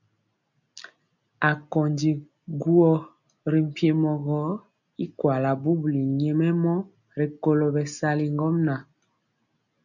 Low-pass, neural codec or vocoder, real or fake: 7.2 kHz; none; real